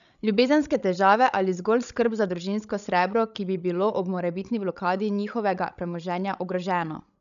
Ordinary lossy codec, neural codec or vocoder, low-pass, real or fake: none; codec, 16 kHz, 16 kbps, FreqCodec, larger model; 7.2 kHz; fake